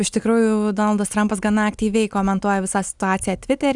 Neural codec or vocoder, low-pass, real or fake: none; 10.8 kHz; real